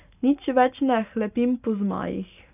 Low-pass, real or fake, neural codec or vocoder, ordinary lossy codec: 3.6 kHz; real; none; none